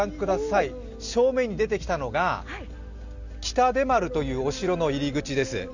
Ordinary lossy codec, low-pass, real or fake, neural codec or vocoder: none; 7.2 kHz; real; none